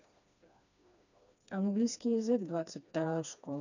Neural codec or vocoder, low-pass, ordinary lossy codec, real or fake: codec, 16 kHz, 2 kbps, FreqCodec, smaller model; 7.2 kHz; none; fake